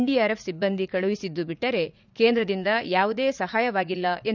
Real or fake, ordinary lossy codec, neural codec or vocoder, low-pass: fake; none; vocoder, 44.1 kHz, 80 mel bands, Vocos; 7.2 kHz